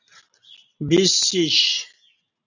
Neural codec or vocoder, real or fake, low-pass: none; real; 7.2 kHz